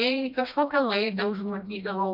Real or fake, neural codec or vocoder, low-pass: fake; codec, 16 kHz, 1 kbps, FreqCodec, smaller model; 5.4 kHz